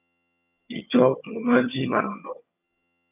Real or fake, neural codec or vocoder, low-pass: fake; vocoder, 22.05 kHz, 80 mel bands, HiFi-GAN; 3.6 kHz